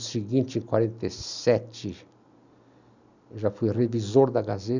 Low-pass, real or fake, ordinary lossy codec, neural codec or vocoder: 7.2 kHz; real; none; none